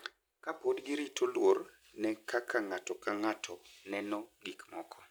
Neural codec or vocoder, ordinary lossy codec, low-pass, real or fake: none; none; none; real